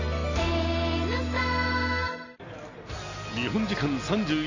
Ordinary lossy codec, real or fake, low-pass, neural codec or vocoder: AAC, 32 kbps; real; 7.2 kHz; none